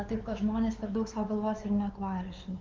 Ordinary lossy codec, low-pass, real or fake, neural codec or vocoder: Opus, 24 kbps; 7.2 kHz; fake; codec, 16 kHz, 4 kbps, X-Codec, WavLM features, trained on Multilingual LibriSpeech